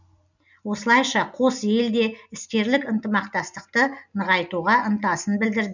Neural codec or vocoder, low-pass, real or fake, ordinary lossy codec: none; 7.2 kHz; real; none